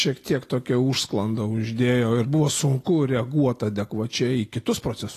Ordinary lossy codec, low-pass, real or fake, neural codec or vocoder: AAC, 48 kbps; 14.4 kHz; real; none